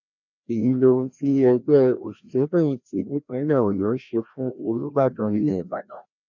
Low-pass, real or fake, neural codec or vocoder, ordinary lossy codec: 7.2 kHz; fake; codec, 16 kHz, 1 kbps, FreqCodec, larger model; none